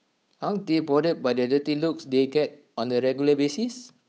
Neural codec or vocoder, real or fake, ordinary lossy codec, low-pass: codec, 16 kHz, 8 kbps, FunCodec, trained on Chinese and English, 25 frames a second; fake; none; none